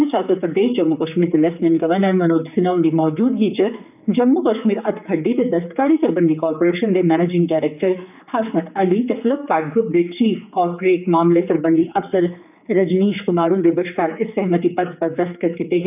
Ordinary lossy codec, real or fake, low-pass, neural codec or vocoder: none; fake; 3.6 kHz; codec, 16 kHz, 4 kbps, X-Codec, HuBERT features, trained on general audio